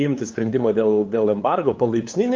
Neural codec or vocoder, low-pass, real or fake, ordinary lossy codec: codec, 16 kHz, 2 kbps, FunCodec, trained on LibriTTS, 25 frames a second; 7.2 kHz; fake; Opus, 32 kbps